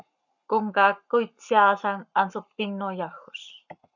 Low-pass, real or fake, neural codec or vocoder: 7.2 kHz; fake; codec, 44.1 kHz, 7.8 kbps, Pupu-Codec